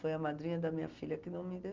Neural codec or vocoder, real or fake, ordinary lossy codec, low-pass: none; real; Opus, 32 kbps; 7.2 kHz